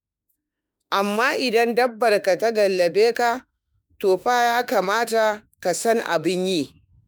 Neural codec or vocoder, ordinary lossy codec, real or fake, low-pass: autoencoder, 48 kHz, 32 numbers a frame, DAC-VAE, trained on Japanese speech; none; fake; none